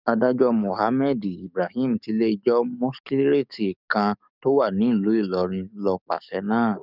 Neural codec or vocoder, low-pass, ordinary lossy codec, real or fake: codec, 44.1 kHz, 7.8 kbps, DAC; 5.4 kHz; none; fake